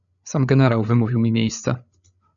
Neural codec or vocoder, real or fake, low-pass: codec, 16 kHz, 8 kbps, FreqCodec, larger model; fake; 7.2 kHz